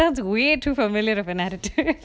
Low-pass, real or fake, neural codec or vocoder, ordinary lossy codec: none; real; none; none